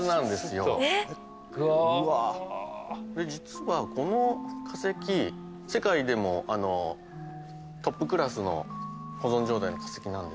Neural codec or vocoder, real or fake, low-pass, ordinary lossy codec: none; real; none; none